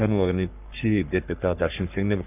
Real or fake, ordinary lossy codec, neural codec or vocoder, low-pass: fake; none; codec, 44.1 kHz, 3.4 kbps, Pupu-Codec; 3.6 kHz